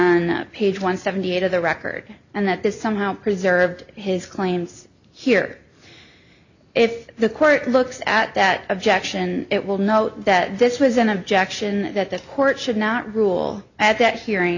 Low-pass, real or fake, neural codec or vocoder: 7.2 kHz; real; none